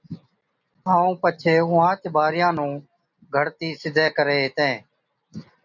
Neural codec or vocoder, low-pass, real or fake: none; 7.2 kHz; real